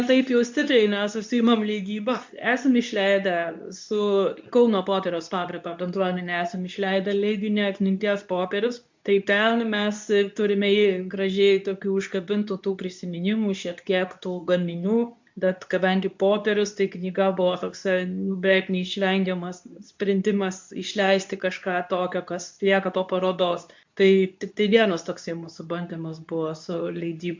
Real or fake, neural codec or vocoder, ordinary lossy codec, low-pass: fake; codec, 24 kHz, 0.9 kbps, WavTokenizer, medium speech release version 2; MP3, 64 kbps; 7.2 kHz